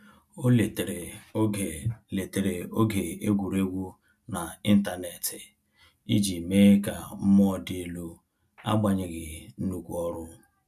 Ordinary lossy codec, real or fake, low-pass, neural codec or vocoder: none; real; 14.4 kHz; none